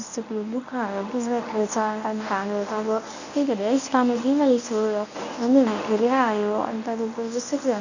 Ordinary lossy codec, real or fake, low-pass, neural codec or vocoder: none; fake; 7.2 kHz; codec, 24 kHz, 0.9 kbps, WavTokenizer, medium speech release version 1